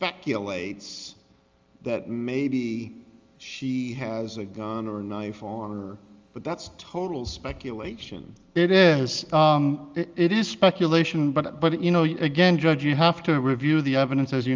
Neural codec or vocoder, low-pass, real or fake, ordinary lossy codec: none; 7.2 kHz; real; Opus, 32 kbps